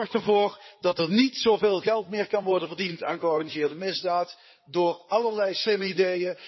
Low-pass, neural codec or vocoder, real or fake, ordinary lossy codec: 7.2 kHz; codec, 16 kHz in and 24 kHz out, 2.2 kbps, FireRedTTS-2 codec; fake; MP3, 24 kbps